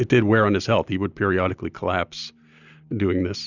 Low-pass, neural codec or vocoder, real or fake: 7.2 kHz; none; real